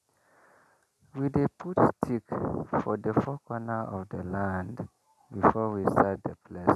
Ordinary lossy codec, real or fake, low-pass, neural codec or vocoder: none; real; 14.4 kHz; none